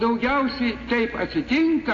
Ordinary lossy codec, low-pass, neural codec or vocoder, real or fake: AAC, 32 kbps; 7.2 kHz; none; real